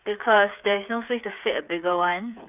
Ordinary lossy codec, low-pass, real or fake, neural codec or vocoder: none; 3.6 kHz; fake; codec, 16 kHz, 8 kbps, FreqCodec, smaller model